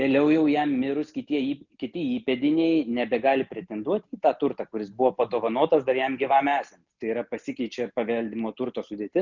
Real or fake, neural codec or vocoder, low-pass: real; none; 7.2 kHz